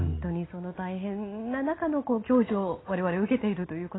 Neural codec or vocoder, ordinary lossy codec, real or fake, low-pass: none; AAC, 16 kbps; real; 7.2 kHz